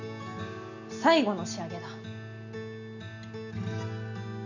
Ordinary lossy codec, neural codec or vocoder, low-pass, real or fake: none; none; 7.2 kHz; real